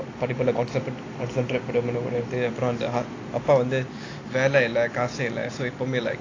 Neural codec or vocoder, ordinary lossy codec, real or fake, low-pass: none; AAC, 32 kbps; real; 7.2 kHz